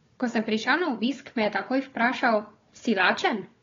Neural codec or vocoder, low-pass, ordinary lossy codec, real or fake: codec, 16 kHz, 4 kbps, FunCodec, trained on Chinese and English, 50 frames a second; 7.2 kHz; AAC, 32 kbps; fake